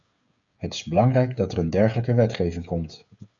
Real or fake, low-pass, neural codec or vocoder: fake; 7.2 kHz; codec, 16 kHz, 8 kbps, FreqCodec, smaller model